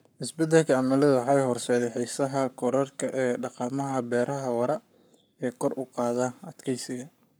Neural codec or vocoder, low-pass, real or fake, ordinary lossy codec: codec, 44.1 kHz, 7.8 kbps, Pupu-Codec; none; fake; none